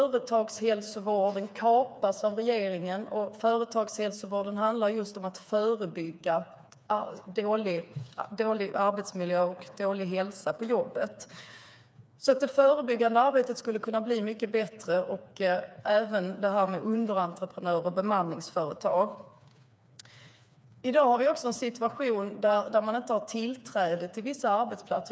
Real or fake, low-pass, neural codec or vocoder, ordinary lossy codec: fake; none; codec, 16 kHz, 4 kbps, FreqCodec, smaller model; none